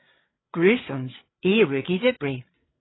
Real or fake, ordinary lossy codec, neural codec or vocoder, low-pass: fake; AAC, 16 kbps; codec, 16 kHz, 8 kbps, FreqCodec, larger model; 7.2 kHz